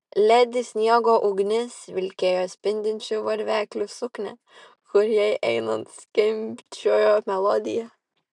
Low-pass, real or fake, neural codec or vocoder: 10.8 kHz; real; none